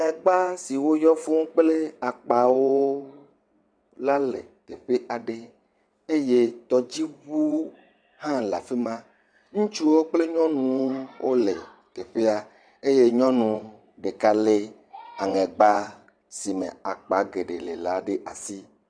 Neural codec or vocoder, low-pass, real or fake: vocoder, 22.05 kHz, 80 mel bands, WaveNeXt; 9.9 kHz; fake